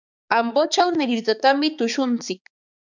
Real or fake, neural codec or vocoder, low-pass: fake; codec, 16 kHz, 4 kbps, X-Codec, HuBERT features, trained on balanced general audio; 7.2 kHz